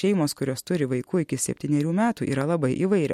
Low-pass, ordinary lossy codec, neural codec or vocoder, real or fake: 19.8 kHz; MP3, 64 kbps; none; real